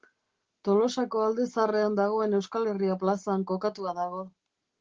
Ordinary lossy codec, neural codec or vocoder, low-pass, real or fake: Opus, 16 kbps; none; 7.2 kHz; real